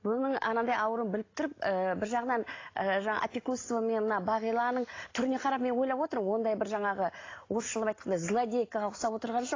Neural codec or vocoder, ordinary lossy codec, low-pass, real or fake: none; AAC, 32 kbps; 7.2 kHz; real